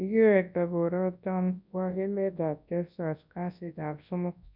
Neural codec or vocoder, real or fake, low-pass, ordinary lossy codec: codec, 24 kHz, 0.9 kbps, WavTokenizer, large speech release; fake; 5.4 kHz; none